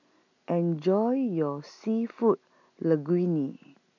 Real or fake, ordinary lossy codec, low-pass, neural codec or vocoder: real; none; 7.2 kHz; none